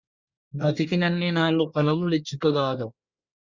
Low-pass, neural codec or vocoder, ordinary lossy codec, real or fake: 7.2 kHz; codec, 24 kHz, 1 kbps, SNAC; Opus, 64 kbps; fake